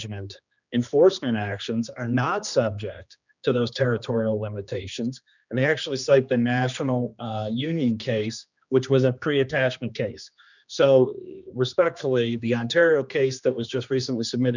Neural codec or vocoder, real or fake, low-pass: codec, 16 kHz, 2 kbps, X-Codec, HuBERT features, trained on general audio; fake; 7.2 kHz